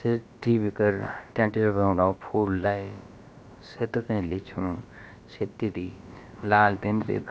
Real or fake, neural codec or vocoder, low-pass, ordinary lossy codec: fake; codec, 16 kHz, about 1 kbps, DyCAST, with the encoder's durations; none; none